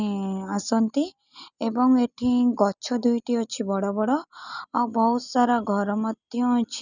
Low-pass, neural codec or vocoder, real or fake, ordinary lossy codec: 7.2 kHz; none; real; none